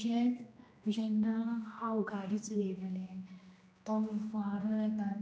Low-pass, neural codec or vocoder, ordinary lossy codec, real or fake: none; codec, 16 kHz, 1 kbps, X-Codec, HuBERT features, trained on general audio; none; fake